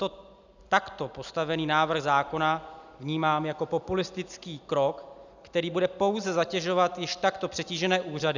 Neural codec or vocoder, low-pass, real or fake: none; 7.2 kHz; real